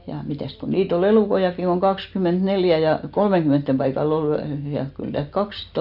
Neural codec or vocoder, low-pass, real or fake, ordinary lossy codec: none; 5.4 kHz; real; none